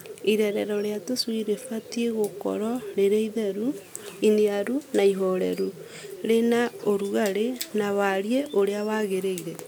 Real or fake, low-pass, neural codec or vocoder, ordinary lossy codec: real; none; none; none